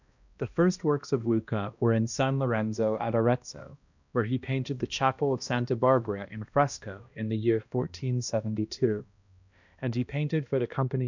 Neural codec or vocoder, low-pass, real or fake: codec, 16 kHz, 1 kbps, X-Codec, HuBERT features, trained on balanced general audio; 7.2 kHz; fake